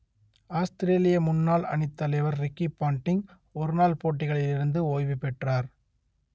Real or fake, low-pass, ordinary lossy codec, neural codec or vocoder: real; none; none; none